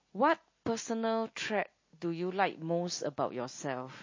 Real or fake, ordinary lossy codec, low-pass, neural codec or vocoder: real; MP3, 32 kbps; 7.2 kHz; none